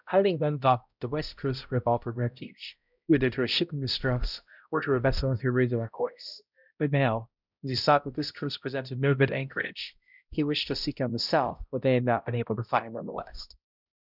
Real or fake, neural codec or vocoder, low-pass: fake; codec, 16 kHz, 0.5 kbps, X-Codec, HuBERT features, trained on balanced general audio; 5.4 kHz